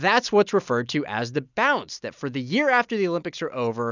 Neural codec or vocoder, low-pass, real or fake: none; 7.2 kHz; real